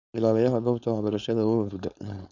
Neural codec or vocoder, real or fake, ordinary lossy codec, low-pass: codec, 16 kHz, 4.8 kbps, FACodec; fake; none; 7.2 kHz